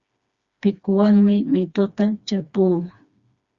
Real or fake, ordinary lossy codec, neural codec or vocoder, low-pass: fake; Opus, 64 kbps; codec, 16 kHz, 2 kbps, FreqCodec, smaller model; 7.2 kHz